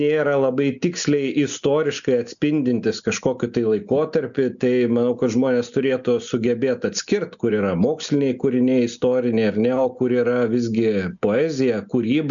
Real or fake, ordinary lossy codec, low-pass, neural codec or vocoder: real; MP3, 96 kbps; 7.2 kHz; none